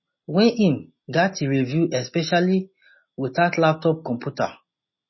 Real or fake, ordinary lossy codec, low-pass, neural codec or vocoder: real; MP3, 24 kbps; 7.2 kHz; none